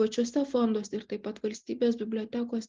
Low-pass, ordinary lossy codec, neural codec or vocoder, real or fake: 7.2 kHz; Opus, 24 kbps; none; real